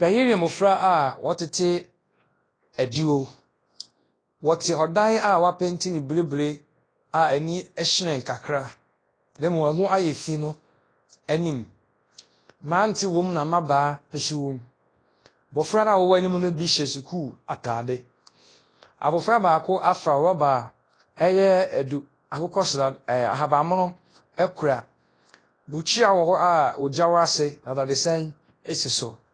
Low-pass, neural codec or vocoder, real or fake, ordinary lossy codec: 9.9 kHz; codec, 24 kHz, 0.9 kbps, WavTokenizer, large speech release; fake; AAC, 32 kbps